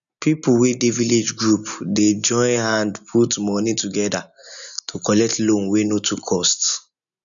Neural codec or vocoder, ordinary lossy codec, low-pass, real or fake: none; none; 7.2 kHz; real